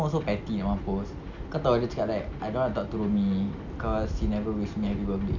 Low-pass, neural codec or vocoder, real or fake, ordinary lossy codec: 7.2 kHz; none; real; none